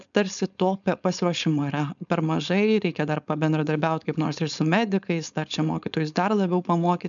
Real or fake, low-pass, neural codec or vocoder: fake; 7.2 kHz; codec, 16 kHz, 4.8 kbps, FACodec